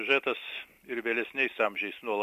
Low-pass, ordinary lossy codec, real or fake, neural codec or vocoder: 14.4 kHz; MP3, 64 kbps; fake; vocoder, 44.1 kHz, 128 mel bands every 256 samples, BigVGAN v2